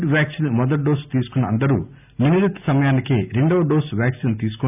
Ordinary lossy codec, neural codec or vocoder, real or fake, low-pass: none; none; real; 3.6 kHz